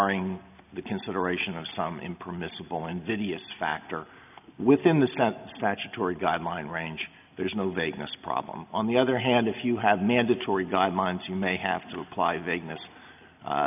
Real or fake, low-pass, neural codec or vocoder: real; 3.6 kHz; none